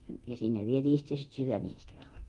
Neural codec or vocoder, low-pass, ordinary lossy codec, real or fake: codec, 24 kHz, 0.9 kbps, DualCodec; 10.8 kHz; Opus, 32 kbps; fake